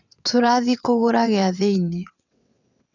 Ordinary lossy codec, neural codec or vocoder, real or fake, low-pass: none; vocoder, 22.05 kHz, 80 mel bands, WaveNeXt; fake; 7.2 kHz